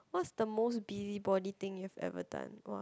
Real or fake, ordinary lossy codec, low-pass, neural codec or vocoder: real; none; none; none